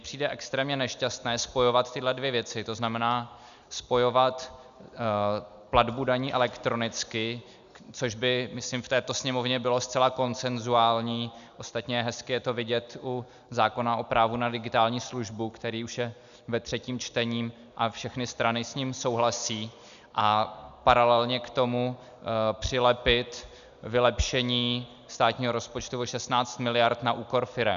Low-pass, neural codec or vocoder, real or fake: 7.2 kHz; none; real